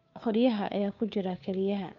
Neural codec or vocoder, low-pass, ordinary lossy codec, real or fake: codec, 16 kHz, 2 kbps, FunCodec, trained on Chinese and English, 25 frames a second; 7.2 kHz; MP3, 96 kbps; fake